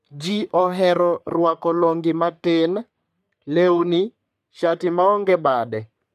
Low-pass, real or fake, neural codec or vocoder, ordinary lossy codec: 14.4 kHz; fake; codec, 44.1 kHz, 3.4 kbps, Pupu-Codec; none